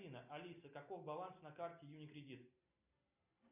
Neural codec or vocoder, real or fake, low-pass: none; real; 3.6 kHz